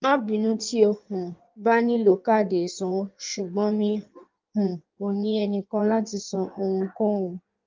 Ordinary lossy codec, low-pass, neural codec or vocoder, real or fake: Opus, 24 kbps; 7.2 kHz; codec, 16 kHz in and 24 kHz out, 1.1 kbps, FireRedTTS-2 codec; fake